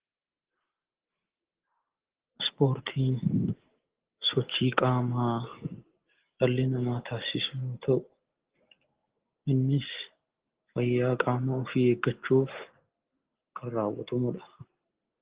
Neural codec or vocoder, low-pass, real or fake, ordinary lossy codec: none; 3.6 kHz; real; Opus, 16 kbps